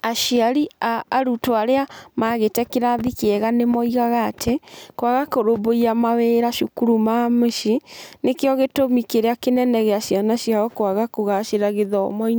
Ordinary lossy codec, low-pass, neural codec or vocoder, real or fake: none; none; none; real